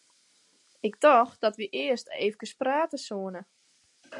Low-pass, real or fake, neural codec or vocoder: 10.8 kHz; real; none